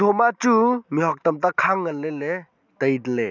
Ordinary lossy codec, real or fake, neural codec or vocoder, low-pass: none; real; none; 7.2 kHz